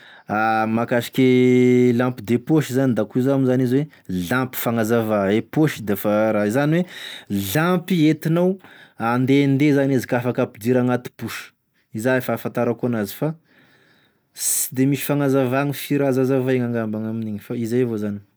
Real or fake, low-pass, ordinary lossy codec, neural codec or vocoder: real; none; none; none